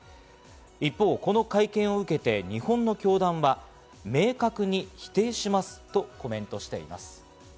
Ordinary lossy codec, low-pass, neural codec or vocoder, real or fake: none; none; none; real